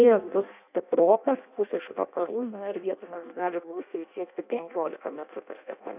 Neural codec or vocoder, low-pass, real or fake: codec, 16 kHz in and 24 kHz out, 0.6 kbps, FireRedTTS-2 codec; 3.6 kHz; fake